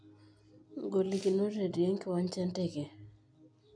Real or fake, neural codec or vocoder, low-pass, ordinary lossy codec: real; none; 9.9 kHz; none